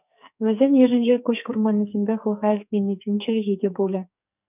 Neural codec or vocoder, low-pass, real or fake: codec, 44.1 kHz, 2.6 kbps, SNAC; 3.6 kHz; fake